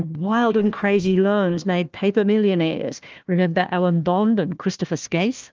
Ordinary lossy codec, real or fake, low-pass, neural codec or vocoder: Opus, 24 kbps; fake; 7.2 kHz; codec, 16 kHz, 1 kbps, FunCodec, trained on Chinese and English, 50 frames a second